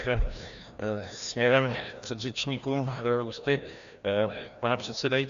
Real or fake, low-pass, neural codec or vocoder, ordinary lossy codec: fake; 7.2 kHz; codec, 16 kHz, 1 kbps, FreqCodec, larger model; AAC, 96 kbps